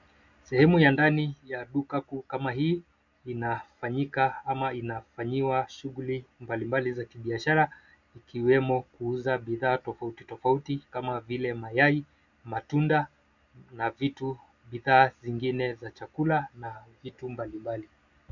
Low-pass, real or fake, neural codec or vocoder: 7.2 kHz; real; none